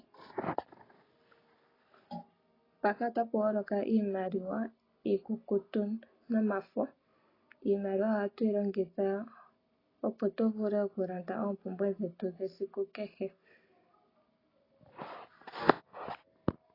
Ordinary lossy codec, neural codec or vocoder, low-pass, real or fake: AAC, 24 kbps; none; 5.4 kHz; real